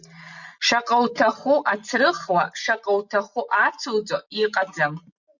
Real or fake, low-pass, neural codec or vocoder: real; 7.2 kHz; none